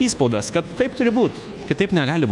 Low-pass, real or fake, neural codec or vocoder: 10.8 kHz; fake; codec, 24 kHz, 1.2 kbps, DualCodec